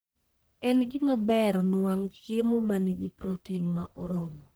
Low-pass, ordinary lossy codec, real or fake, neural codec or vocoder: none; none; fake; codec, 44.1 kHz, 1.7 kbps, Pupu-Codec